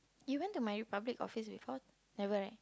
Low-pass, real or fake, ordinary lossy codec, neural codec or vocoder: none; real; none; none